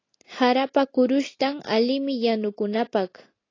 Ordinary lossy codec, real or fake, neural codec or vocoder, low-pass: AAC, 32 kbps; real; none; 7.2 kHz